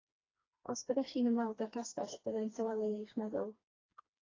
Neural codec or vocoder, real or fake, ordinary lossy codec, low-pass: codec, 16 kHz, 2 kbps, FreqCodec, smaller model; fake; AAC, 32 kbps; 7.2 kHz